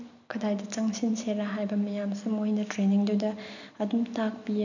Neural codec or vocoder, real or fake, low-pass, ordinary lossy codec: none; real; 7.2 kHz; none